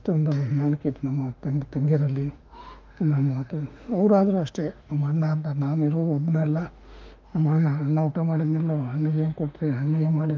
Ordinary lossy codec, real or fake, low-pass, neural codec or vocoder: Opus, 24 kbps; fake; 7.2 kHz; autoencoder, 48 kHz, 32 numbers a frame, DAC-VAE, trained on Japanese speech